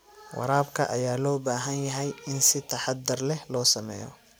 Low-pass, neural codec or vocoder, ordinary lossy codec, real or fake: none; none; none; real